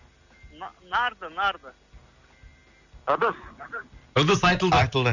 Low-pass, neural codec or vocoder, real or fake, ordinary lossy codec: 7.2 kHz; none; real; none